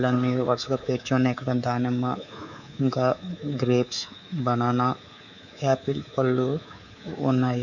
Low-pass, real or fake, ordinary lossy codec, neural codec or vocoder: 7.2 kHz; fake; none; codec, 24 kHz, 3.1 kbps, DualCodec